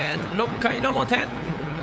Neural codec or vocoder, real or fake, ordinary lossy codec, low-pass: codec, 16 kHz, 8 kbps, FunCodec, trained on LibriTTS, 25 frames a second; fake; none; none